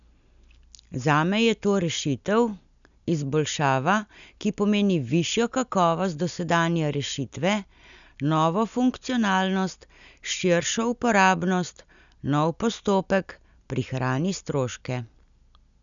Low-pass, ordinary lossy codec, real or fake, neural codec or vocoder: 7.2 kHz; none; real; none